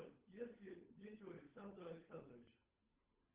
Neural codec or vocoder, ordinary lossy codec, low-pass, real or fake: codec, 16 kHz, 16 kbps, FunCodec, trained on LibriTTS, 50 frames a second; Opus, 16 kbps; 3.6 kHz; fake